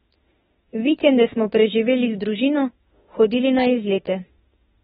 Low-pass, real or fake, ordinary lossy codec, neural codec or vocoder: 19.8 kHz; fake; AAC, 16 kbps; autoencoder, 48 kHz, 32 numbers a frame, DAC-VAE, trained on Japanese speech